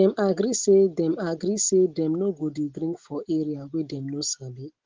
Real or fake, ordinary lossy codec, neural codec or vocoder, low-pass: real; Opus, 16 kbps; none; 7.2 kHz